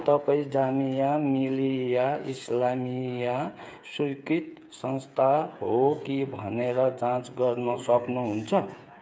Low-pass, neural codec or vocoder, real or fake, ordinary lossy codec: none; codec, 16 kHz, 8 kbps, FreqCodec, smaller model; fake; none